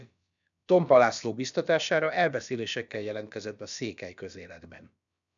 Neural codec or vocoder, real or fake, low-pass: codec, 16 kHz, about 1 kbps, DyCAST, with the encoder's durations; fake; 7.2 kHz